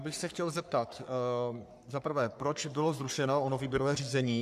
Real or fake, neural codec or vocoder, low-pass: fake; codec, 44.1 kHz, 3.4 kbps, Pupu-Codec; 14.4 kHz